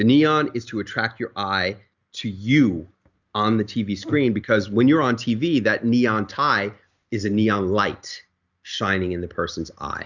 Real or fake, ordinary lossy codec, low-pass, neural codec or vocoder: real; Opus, 64 kbps; 7.2 kHz; none